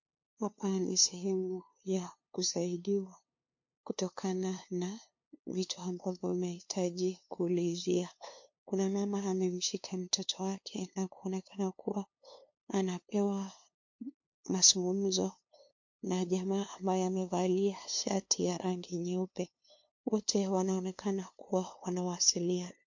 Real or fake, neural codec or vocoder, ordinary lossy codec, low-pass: fake; codec, 16 kHz, 2 kbps, FunCodec, trained on LibriTTS, 25 frames a second; MP3, 48 kbps; 7.2 kHz